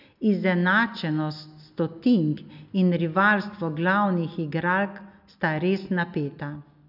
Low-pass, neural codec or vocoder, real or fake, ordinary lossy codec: 5.4 kHz; none; real; none